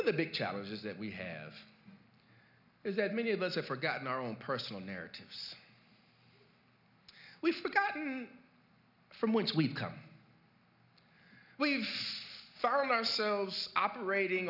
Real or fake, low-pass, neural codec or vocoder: real; 5.4 kHz; none